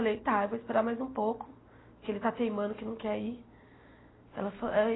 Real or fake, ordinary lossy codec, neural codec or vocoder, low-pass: real; AAC, 16 kbps; none; 7.2 kHz